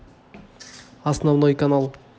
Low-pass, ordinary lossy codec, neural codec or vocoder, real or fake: none; none; none; real